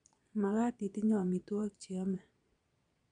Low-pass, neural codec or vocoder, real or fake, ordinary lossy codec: 9.9 kHz; vocoder, 22.05 kHz, 80 mel bands, Vocos; fake; none